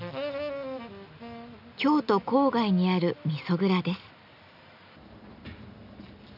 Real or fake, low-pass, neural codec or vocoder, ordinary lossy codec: real; 5.4 kHz; none; none